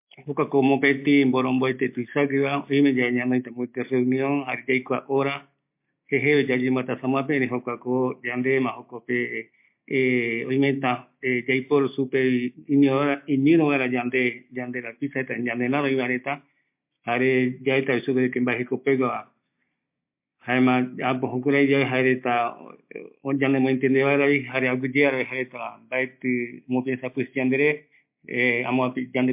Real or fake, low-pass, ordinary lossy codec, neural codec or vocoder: real; 3.6 kHz; MP3, 32 kbps; none